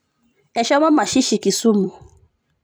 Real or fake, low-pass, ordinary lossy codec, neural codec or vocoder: fake; none; none; vocoder, 44.1 kHz, 128 mel bands every 256 samples, BigVGAN v2